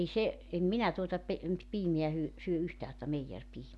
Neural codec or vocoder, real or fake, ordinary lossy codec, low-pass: none; real; none; none